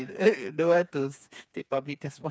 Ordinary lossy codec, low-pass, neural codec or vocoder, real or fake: none; none; codec, 16 kHz, 4 kbps, FreqCodec, smaller model; fake